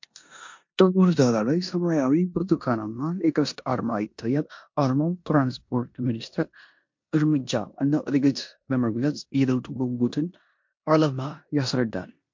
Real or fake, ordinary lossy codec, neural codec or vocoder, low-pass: fake; MP3, 48 kbps; codec, 16 kHz in and 24 kHz out, 0.9 kbps, LongCat-Audio-Codec, four codebook decoder; 7.2 kHz